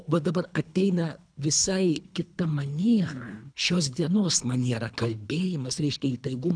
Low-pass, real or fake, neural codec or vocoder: 9.9 kHz; fake; codec, 24 kHz, 3 kbps, HILCodec